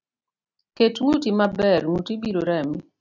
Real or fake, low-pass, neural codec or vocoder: real; 7.2 kHz; none